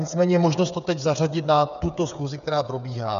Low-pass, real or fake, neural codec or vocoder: 7.2 kHz; fake; codec, 16 kHz, 8 kbps, FreqCodec, smaller model